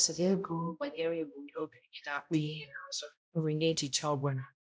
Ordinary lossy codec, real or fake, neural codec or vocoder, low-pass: none; fake; codec, 16 kHz, 0.5 kbps, X-Codec, HuBERT features, trained on balanced general audio; none